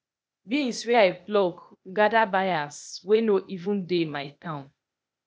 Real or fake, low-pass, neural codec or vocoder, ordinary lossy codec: fake; none; codec, 16 kHz, 0.8 kbps, ZipCodec; none